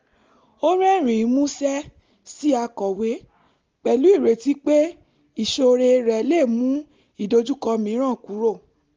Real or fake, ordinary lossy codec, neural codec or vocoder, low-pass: real; Opus, 32 kbps; none; 7.2 kHz